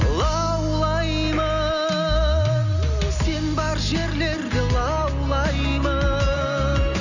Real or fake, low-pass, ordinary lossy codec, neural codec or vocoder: real; 7.2 kHz; none; none